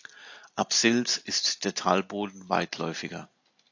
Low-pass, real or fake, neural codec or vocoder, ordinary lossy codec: 7.2 kHz; real; none; AAC, 48 kbps